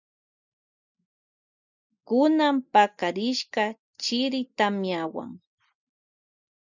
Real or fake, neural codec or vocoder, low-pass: real; none; 7.2 kHz